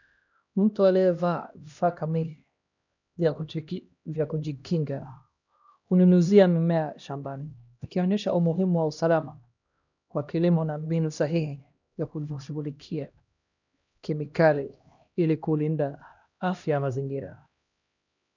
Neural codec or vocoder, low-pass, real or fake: codec, 16 kHz, 1 kbps, X-Codec, HuBERT features, trained on LibriSpeech; 7.2 kHz; fake